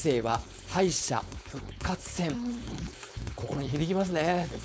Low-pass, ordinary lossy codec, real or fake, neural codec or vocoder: none; none; fake; codec, 16 kHz, 4.8 kbps, FACodec